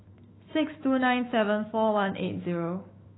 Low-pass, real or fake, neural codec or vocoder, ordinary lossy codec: 7.2 kHz; fake; autoencoder, 48 kHz, 128 numbers a frame, DAC-VAE, trained on Japanese speech; AAC, 16 kbps